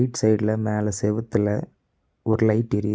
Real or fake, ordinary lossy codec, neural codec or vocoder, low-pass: real; none; none; none